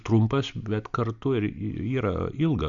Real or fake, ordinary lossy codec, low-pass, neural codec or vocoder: real; Opus, 64 kbps; 7.2 kHz; none